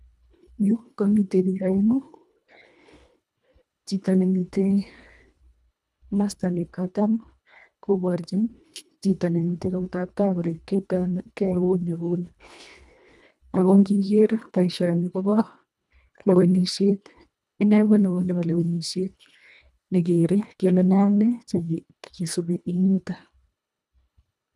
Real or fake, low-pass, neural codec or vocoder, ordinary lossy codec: fake; none; codec, 24 kHz, 1.5 kbps, HILCodec; none